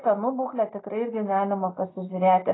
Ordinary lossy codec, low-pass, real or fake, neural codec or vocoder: AAC, 16 kbps; 7.2 kHz; real; none